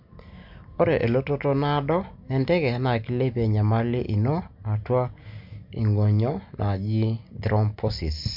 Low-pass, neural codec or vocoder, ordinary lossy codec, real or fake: 5.4 kHz; none; MP3, 48 kbps; real